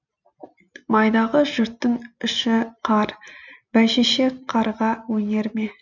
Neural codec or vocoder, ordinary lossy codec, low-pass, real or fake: none; none; 7.2 kHz; real